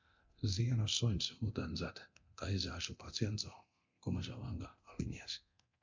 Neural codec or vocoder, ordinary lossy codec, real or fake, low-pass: codec, 24 kHz, 0.9 kbps, DualCodec; MP3, 64 kbps; fake; 7.2 kHz